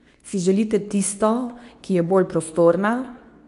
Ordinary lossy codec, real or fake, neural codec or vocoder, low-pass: none; fake; codec, 24 kHz, 0.9 kbps, WavTokenizer, medium speech release version 2; 10.8 kHz